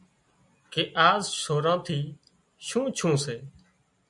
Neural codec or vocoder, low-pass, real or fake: none; 10.8 kHz; real